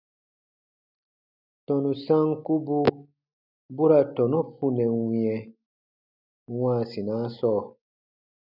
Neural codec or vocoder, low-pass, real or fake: none; 5.4 kHz; real